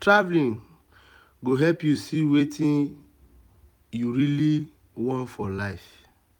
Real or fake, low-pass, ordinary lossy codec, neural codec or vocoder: fake; 19.8 kHz; none; vocoder, 44.1 kHz, 128 mel bands every 256 samples, BigVGAN v2